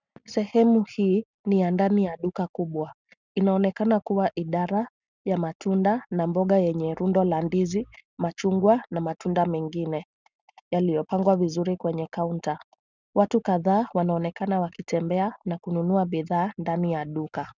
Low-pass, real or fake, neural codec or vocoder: 7.2 kHz; real; none